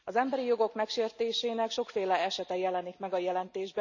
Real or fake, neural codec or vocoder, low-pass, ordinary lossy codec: real; none; 7.2 kHz; none